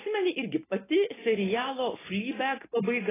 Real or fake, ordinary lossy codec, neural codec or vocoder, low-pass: real; AAC, 16 kbps; none; 3.6 kHz